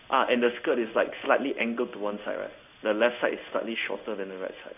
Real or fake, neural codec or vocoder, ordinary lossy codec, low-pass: fake; codec, 16 kHz in and 24 kHz out, 1 kbps, XY-Tokenizer; none; 3.6 kHz